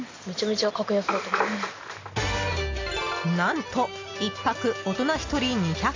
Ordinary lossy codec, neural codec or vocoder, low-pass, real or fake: AAC, 32 kbps; none; 7.2 kHz; real